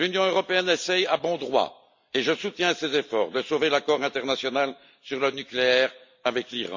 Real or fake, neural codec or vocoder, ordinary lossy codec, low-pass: real; none; MP3, 64 kbps; 7.2 kHz